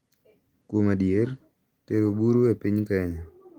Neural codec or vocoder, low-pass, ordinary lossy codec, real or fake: none; 19.8 kHz; Opus, 24 kbps; real